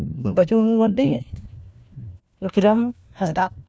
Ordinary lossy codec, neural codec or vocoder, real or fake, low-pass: none; codec, 16 kHz, 1 kbps, FunCodec, trained on LibriTTS, 50 frames a second; fake; none